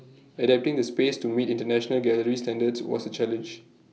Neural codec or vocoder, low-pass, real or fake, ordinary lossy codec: none; none; real; none